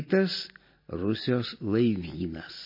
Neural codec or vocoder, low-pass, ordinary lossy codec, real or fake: none; 5.4 kHz; MP3, 24 kbps; real